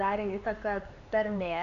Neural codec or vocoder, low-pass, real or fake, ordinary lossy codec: codec, 16 kHz, 4 kbps, X-Codec, HuBERT features, trained on LibriSpeech; 7.2 kHz; fake; AAC, 96 kbps